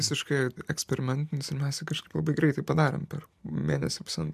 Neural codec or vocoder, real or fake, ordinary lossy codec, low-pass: none; real; MP3, 96 kbps; 14.4 kHz